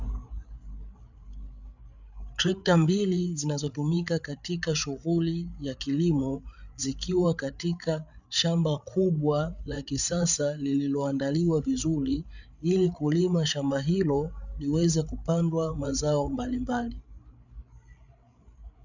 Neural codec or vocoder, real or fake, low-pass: codec, 16 kHz, 8 kbps, FreqCodec, larger model; fake; 7.2 kHz